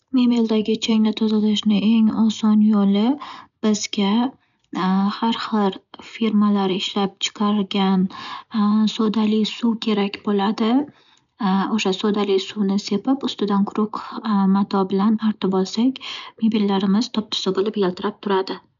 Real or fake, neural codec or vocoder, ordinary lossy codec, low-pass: real; none; none; 7.2 kHz